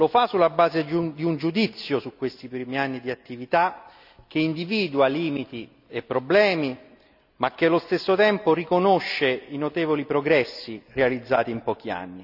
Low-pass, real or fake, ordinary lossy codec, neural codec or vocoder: 5.4 kHz; real; none; none